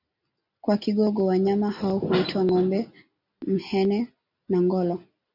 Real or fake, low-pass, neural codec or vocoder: real; 5.4 kHz; none